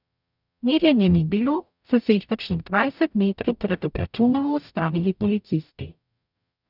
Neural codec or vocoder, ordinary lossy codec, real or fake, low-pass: codec, 44.1 kHz, 0.9 kbps, DAC; none; fake; 5.4 kHz